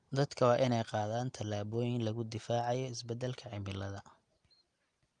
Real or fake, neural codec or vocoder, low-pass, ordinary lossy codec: real; none; 9.9 kHz; Opus, 32 kbps